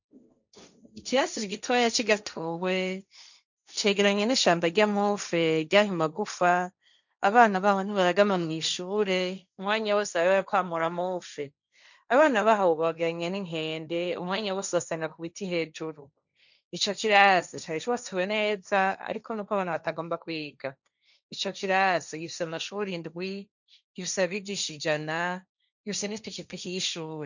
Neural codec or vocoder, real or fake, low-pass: codec, 16 kHz, 1.1 kbps, Voila-Tokenizer; fake; 7.2 kHz